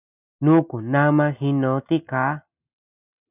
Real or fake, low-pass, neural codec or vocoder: real; 3.6 kHz; none